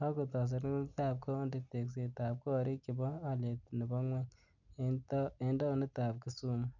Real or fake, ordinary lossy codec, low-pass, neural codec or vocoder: real; none; 7.2 kHz; none